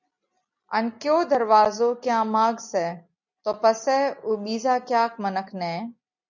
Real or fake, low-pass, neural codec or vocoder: real; 7.2 kHz; none